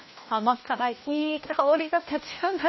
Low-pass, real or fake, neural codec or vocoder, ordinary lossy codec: 7.2 kHz; fake; codec, 16 kHz, 1 kbps, FunCodec, trained on LibriTTS, 50 frames a second; MP3, 24 kbps